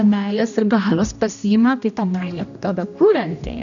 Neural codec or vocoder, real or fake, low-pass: codec, 16 kHz, 1 kbps, X-Codec, HuBERT features, trained on general audio; fake; 7.2 kHz